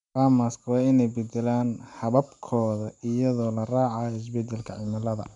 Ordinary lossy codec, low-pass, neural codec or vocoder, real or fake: none; 10.8 kHz; none; real